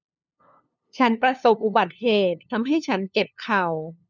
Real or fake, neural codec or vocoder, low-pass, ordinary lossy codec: fake; codec, 16 kHz, 2 kbps, FunCodec, trained on LibriTTS, 25 frames a second; 7.2 kHz; none